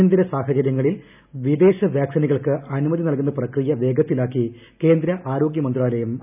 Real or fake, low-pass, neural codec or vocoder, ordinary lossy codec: real; 3.6 kHz; none; none